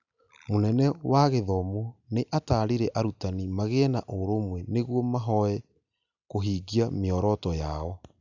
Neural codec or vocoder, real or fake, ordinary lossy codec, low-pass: none; real; none; 7.2 kHz